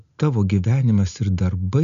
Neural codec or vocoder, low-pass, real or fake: none; 7.2 kHz; real